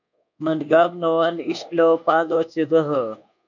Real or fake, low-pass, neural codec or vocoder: fake; 7.2 kHz; codec, 24 kHz, 1.2 kbps, DualCodec